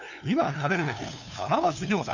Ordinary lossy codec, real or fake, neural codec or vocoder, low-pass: none; fake; codec, 16 kHz, 2 kbps, FunCodec, trained on LibriTTS, 25 frames a second; 7.2 kHz